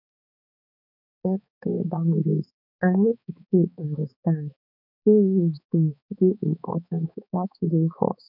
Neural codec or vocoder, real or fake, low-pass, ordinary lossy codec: codec, 16 kHz, 4 kbps, X-Codec, HuBERT features, trained on balanced general audio; fake; 5.4 kHz; none